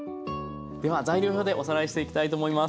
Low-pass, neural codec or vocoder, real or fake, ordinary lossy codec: none; none; real; none